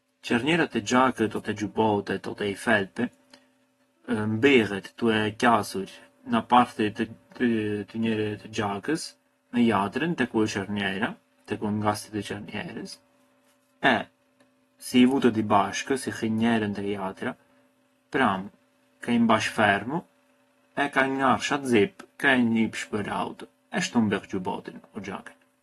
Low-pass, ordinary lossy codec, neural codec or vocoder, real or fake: 19.8 kHz; AAC, 32 kbps; none; real